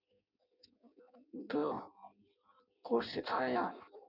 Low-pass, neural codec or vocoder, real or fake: 5.4 kHz; codec, 16 kHz in and 24 kHz out, 0.6 kbps, FireRedTTS-2 codec; fake